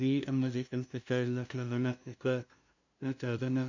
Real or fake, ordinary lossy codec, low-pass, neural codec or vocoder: fake; none; 7.2 kHz; codec, 16 kHz, 0.5 kbps, FunCodec, trained on LibriTTS, 25 frames a second